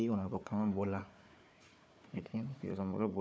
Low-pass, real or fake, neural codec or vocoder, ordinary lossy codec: none; fake; codec, 16 kHz, 4 kbps, FunCodec, trained on Chinese and English, 50 frames a second; none